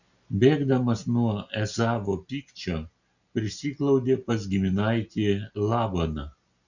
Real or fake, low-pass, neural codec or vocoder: real; 7.2 kHz; none